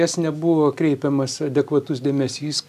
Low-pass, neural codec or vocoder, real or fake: 14.4 kHz; none; real